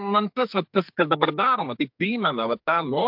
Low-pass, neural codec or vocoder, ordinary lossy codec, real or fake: 5.4 kHz; codec, 44.1 kHz, 2.6 kbps, SNAC; MP3, 48 kbps; fake